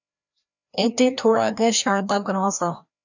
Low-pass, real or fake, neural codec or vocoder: 7.2 kHz; fake; codec, 16 kHz, 1 kbps, FreqCodec, larger model